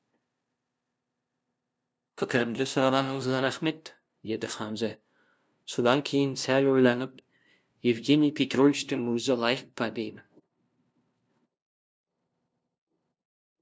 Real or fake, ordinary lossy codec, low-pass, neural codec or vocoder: fake; none; none; codec, 16 kHz, 0.5 kbps, FunCodec, trained on LibriTTS, 25 frames a second